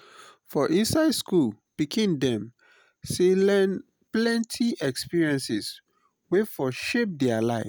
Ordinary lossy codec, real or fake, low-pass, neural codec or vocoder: none; real; none; none